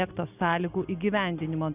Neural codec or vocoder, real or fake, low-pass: none; real; 3.6 kHz